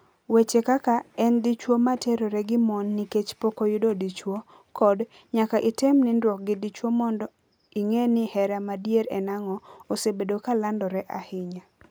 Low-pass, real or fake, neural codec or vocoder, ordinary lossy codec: none; real; none; none